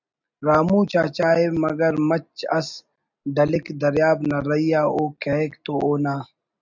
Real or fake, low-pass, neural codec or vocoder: real; 7.2 kHz; none